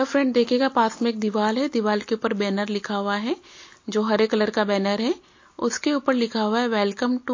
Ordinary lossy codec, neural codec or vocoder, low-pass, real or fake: MP3, 32 kbps; none; 7.2 kHz; real